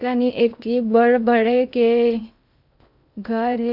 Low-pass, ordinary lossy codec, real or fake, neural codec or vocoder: 5.4 kHz; none; fake; codec, 16 kHz in and 24 kHz out, 0.8 kbps, FocalCodec, streaming, 65536 codes